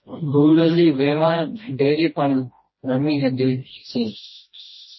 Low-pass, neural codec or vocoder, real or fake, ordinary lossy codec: 7.2 kHz; codec, 16 kHz, 1 kbps, FreqCodec, smaller model; fake; MP3, 24 kbps